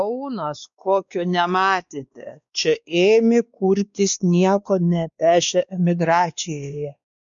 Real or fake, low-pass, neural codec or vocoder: fake; 7.2 kHz; codec, 16 kHz, 2 kbps, X-Codec, WavLM features, trained on Multilingual LibriSpeech